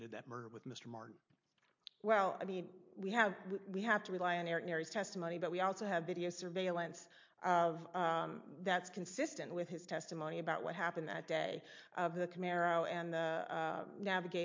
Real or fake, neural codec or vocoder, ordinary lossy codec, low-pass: real; none; MP3, 48 kbps; 7.2 kHz